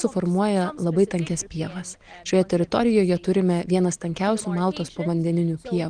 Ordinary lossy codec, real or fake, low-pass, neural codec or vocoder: Opus, 24 kbps; real; 9.9 kHz; none